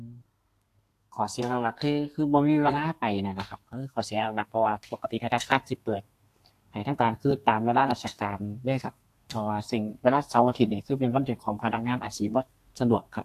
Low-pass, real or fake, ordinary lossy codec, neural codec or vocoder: 14.4 kHz; fake; AAC, 64 kbps; codec, 32 kHz, 1.9 kbps, SNAC